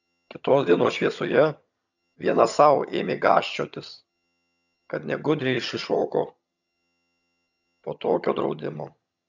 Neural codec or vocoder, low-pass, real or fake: vocoder, 22.05 kHz, 80 mel bands, HiFi-GAN; 7.2 kHz; fake